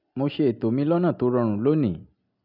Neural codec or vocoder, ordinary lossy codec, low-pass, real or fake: none; none; 5.4 kHz; real